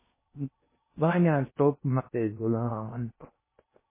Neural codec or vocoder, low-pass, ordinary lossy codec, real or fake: codec, 16 kHz in and 24 kHz out, 0.6 kbps, FocalCodec, streaming, 4096 codes; 3.6 kHz; MP3, 16 kbps; fake